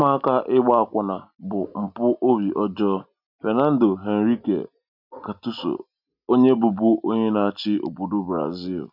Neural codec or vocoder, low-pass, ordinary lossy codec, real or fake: none; 5.4 kHz; none; real